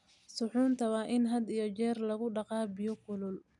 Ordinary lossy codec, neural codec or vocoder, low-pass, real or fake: none; none; 10.8 kHz; real